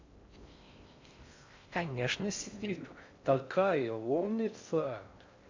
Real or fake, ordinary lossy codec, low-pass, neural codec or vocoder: fake; MP3, 64 kbps; 7.2 kHz; codec, 16 kHz in and 24 kHz out, 0.6 kbps, FocalCodec, streaming, 4096 codes